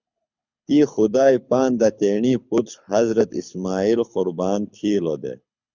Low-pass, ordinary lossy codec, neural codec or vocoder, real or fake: 7.2 kHz; Opus, 64 kbps; codec, 24 kHz, 6 kbps, HILCodec; fake